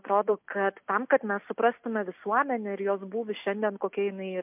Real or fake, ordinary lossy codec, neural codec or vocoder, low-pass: real; MP3, 32 kbps; none; 3.6 kHz